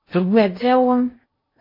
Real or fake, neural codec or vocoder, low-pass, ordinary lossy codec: fake; codec, 16 kHz in and 24 kHz out, 0.6 kbps, FocalCodec, streaming, 2048 codes; 5.4 kHz; MP3, 24 kbps